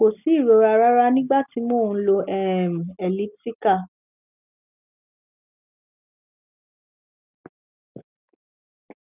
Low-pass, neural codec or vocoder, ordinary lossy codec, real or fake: 3.6 kHz; none; none; real